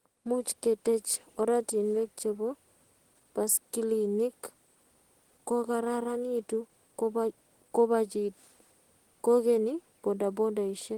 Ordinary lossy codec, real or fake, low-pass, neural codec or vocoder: Opus, 16 kbps; real; 19.8 kHz; none